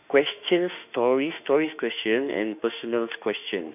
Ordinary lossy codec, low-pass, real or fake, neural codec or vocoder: none; 3.6 kHz; fake; autoencoder, 48 kHz, 32 numbers a frame, DAC-VAE, trained on Japanese speech